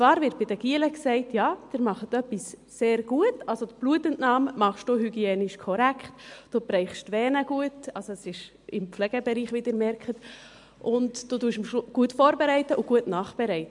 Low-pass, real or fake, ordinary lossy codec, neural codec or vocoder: 10.8 kHz; real; MP3, 64 kbps; none